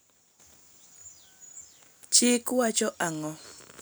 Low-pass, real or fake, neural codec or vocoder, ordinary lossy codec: none; real; none; none